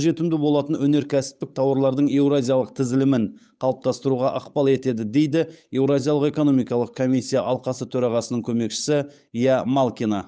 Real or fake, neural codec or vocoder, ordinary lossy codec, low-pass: fake; codec, 16 kHz, 8 kbps, FunCodec, trained on Chinese and English, 25 frames a second; none; none